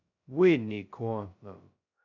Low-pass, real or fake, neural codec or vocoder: 7.2 kHz; fake; codec, 16 kHz, 0.2 kbps, FocalCodec